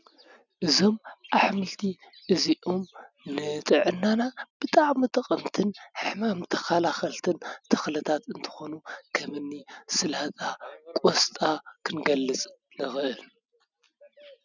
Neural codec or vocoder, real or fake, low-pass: none; real; 7.2 kHz